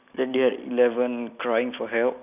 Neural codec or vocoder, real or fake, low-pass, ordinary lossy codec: none; real; 3.6 kHz; none